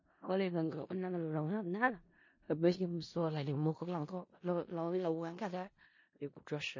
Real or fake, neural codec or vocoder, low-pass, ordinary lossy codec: fake; codec, 16 kHz in and 24 kHz out, 0.4 kbps, LongCat-Audio-Codec, four codebook decoder; 7.2 kHz; MP3, 32 kbps